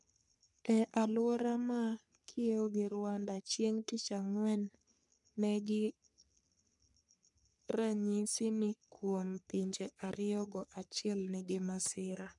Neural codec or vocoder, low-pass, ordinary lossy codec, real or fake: codec, 44.1 kHz, 3.4 kbps, Pupu-Codec; 10.8 kHz; none; fake